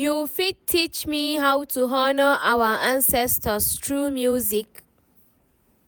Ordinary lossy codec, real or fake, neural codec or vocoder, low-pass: none; fake; vocoder, 48 kHz, 128 mel bands, Vocos; none